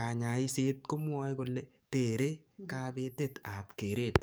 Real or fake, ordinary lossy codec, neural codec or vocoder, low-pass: fake; none; codec, 44.1 kHz, 7.8 kbps, DAC; none